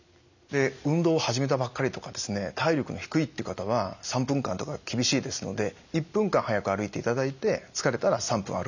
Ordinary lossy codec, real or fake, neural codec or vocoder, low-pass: none; real; none; 7.2 kHz